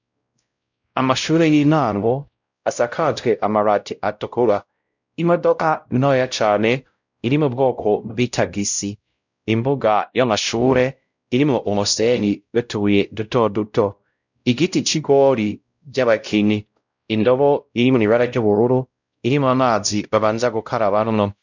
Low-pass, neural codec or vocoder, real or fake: 7.2 kHz; codec, 16 kHz, 0.5 kbps, X-Codec, WavLM features, trained on Multilingual LibriSpeech; fake